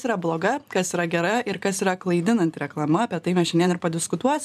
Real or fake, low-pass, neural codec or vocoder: fake; 14.4 kHz; vocoder, 44.1 kHz, 128 mel bands every 512 samples, BigVGAN v2